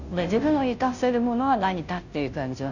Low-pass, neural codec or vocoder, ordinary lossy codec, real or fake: 7.2 kHz; codec, 16 kHz, 0.5 kbps, FunCodec, trained on Chinese and English, 25 frames a second; none; fake